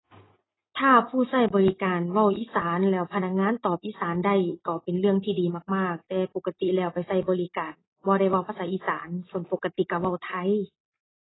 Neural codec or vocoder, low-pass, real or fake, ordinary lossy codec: none; 7.2 kHz; real; AAC, 16 kbps